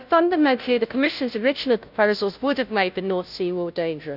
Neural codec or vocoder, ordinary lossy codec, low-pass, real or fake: codec, 16 kHz, 0.5 kbps, FunCodec, trained on Chinese and English, 25 frames a second; none; 5.4 kHz; fake